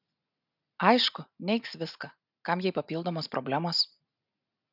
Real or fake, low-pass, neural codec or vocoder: real; 5.4 kHz; none